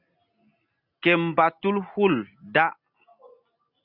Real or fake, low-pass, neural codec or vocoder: real; 5.4 kHz; none